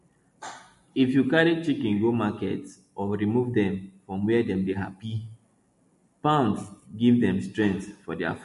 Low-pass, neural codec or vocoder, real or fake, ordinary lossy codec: 14.4 kHz; none; real; MP3, 48 kbps